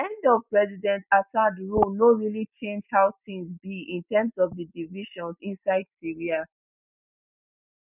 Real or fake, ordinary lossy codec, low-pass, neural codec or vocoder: real; none; 3.6 kHz; none